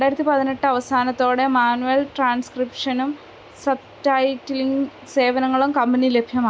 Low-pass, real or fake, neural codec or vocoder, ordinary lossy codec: none; real; none; none